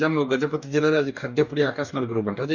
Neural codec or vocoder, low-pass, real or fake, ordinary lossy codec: codec, 44.1 kHz, 2.6 kbps, DAC; 7.2 kHz; fake; none